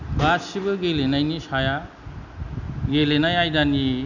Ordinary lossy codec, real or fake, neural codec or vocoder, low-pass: none; real; none; 7.2 kHz